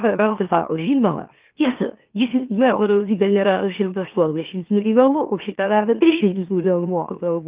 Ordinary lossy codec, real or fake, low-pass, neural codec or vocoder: Opus, 32 kbps; fake; 3.6 kHz; autoencoder, 44.1 kHz, a latent of 192 numbers a frame, MeloTTS